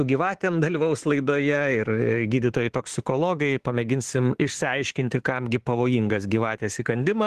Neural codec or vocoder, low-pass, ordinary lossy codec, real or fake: autoencoder, 48 kHz, 32 numbers a frame, DAC-VAE, trained on Japanese speech; 14.4 kHz; Opus, 16 kbps; fake